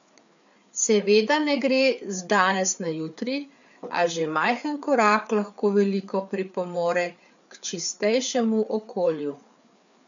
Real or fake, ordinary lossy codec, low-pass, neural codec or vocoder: fake; none; 7.2 kHz; codec, 16 kHz, 4 kbps, FreqCodec, larger model